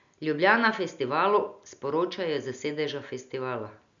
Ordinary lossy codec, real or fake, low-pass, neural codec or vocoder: none; real; 7.2 kHz; none